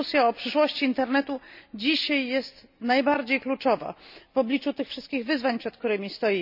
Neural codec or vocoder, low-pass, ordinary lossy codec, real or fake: none; 5.4 kHz; none; real